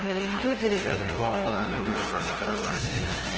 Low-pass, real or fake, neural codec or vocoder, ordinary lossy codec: 7.2 kHz; fake; codec, 16 kHz, 0.5 kbps, FunCodec, trained on LibriTTS, 25 frames a second; Opus, 16 kbps